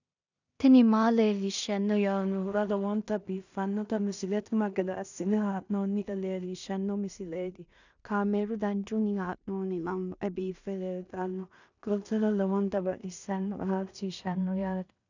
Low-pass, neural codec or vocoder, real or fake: 7.2 kHz; codec, 16 kHz in and 24 kHz out, 0.4 kbps, LongCat-Audio-Codec, two codebook decoder; fake